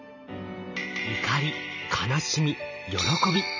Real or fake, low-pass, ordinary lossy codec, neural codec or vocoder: fake; 7.2 kHz; none; vocoder, 44.1 kHz, 128 mel bands every 512 samples, BigVGAN v2